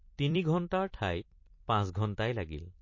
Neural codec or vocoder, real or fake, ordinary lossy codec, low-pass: vocoder, 44.1 kHz, 128 mel bands every 256 samples, BigVGAN v2; fake; MP3, 32 kbps; 7.2 kHz